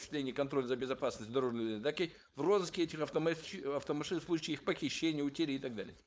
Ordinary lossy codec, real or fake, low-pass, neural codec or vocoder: none; fake; none; codec, 16 kHz, 4.8 kbps, FACodec